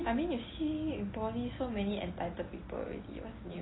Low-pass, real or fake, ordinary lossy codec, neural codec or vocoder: 7.2 kHz; real; AAC, 16 kbps; none